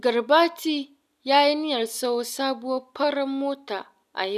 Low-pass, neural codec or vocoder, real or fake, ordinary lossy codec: 14.4 kHz; none; real; none